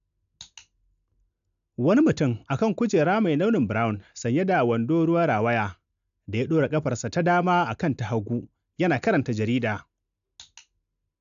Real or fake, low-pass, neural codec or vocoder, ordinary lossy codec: real; 7.2 kHz; none; AAC, 96 kbps